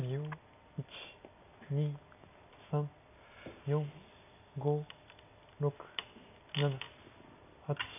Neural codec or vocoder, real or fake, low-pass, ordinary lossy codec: none; real; 3.6 kHz; none